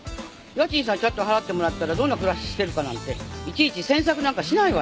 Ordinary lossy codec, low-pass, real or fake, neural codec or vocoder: none; none; real; none